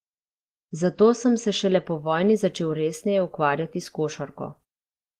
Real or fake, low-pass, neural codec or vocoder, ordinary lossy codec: real; 14.4 kHz; none; Opus, 16 kbps